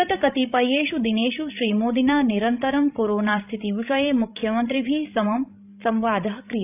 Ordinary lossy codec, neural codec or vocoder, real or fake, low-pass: AAC, 32 kbps; codec, 16 kHz, 16 kbps, FreqCodec, larger model; fake; 3.6 kHz